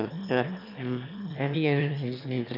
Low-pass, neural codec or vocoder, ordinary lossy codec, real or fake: 5.4 kHz; autoencoder, 22.05 kHz, a latent of 192 numbers a frame, VITS, trained on one speaker; none; fake